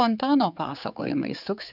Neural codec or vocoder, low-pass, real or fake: codec, 16 kHz, 4 kbps, X-Codec, HuBERT features, trained on general audio; 5.4 kHz; fake